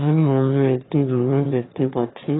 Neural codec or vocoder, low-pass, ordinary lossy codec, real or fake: autoencoder, 22.05 kHz, a latent of 192 numbers a frame, VITS, trained on one speaker; 7.2 kHz; AAC, 16 kbps; fake